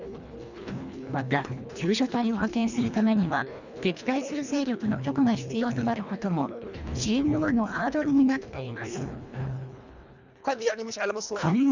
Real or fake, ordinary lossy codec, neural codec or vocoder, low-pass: fake; none; codec, 24 kHz, 1.5 kbps, HILCodec; 7.2 kHz